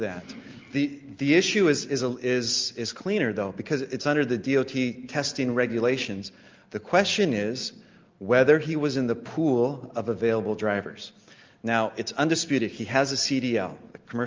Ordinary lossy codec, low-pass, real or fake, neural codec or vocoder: Opus, 24 kbps; 7.2 kHz; real; none